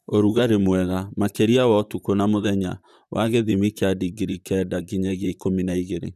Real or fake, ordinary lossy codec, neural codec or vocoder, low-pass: fake; none; vocoder, 44.1 kHz, 128 mel bands, Pupu-Vocoder; 14.4 kHz